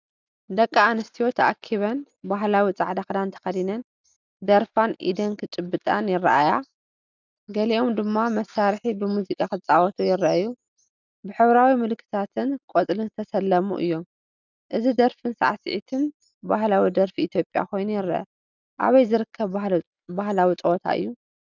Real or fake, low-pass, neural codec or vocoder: real; 7.2 kHz; none